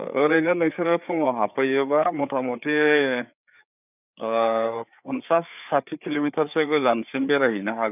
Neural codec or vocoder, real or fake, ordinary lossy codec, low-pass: codec, 16 kHz, 4 kbps, FreqCodec, larger model; fake; none; 3.6 kHz